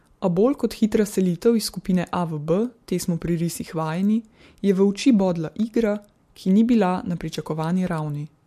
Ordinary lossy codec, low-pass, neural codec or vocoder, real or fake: MP3, 64 kbps; 14.4 kHz; none; real